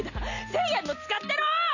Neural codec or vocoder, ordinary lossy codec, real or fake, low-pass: none; none; real; 7.2 kHz